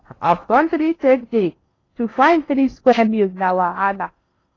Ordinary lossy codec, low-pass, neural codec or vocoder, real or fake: AAC, 32 kbps; 7.2 kHz; codec, 16 kHz in and 24 kHz out, 0.8 kbps, FocalCodec, streaming, 65536 codes; fake